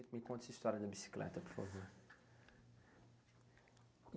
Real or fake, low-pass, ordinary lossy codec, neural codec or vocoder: real; none; none; none